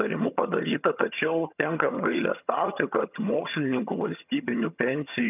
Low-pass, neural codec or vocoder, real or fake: 3.6 kHz; vocoder, 22.05 kHz, 80 mel bands, HiFi-GAN; fake